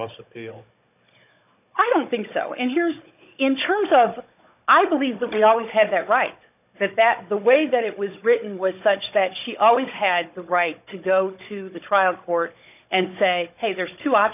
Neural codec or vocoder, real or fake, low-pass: codec, 16 kHz, 16 kbps, FunCodec, trained on Chinese and English, 50 frames a second; fake; 3.6 kHz